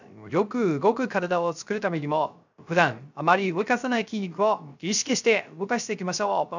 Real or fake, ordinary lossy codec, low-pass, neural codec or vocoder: fake; none; 7.2 kHz; codec, 16 kHz, 0.3 kbps, FocalCodec